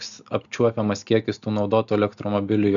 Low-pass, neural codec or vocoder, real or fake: 7.2 kHz; none; real